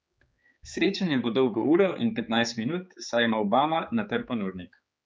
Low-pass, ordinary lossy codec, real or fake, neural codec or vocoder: none; none; fake; codec, 16 kHz, 4 kbps, X-Codec, HuBERT features, trained on general audio